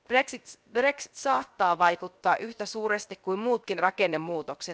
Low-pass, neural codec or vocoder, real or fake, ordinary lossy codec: none; codec, 16 kHz, 0.7 kbps, FocalCodec; fake; none